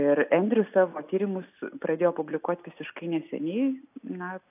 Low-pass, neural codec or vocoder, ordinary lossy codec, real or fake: 3.6 kHz; none; AAC, 32 kbps; real